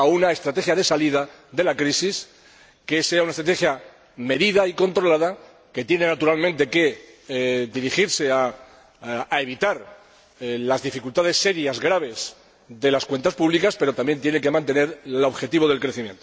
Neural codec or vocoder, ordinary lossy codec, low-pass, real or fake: none; none; none; real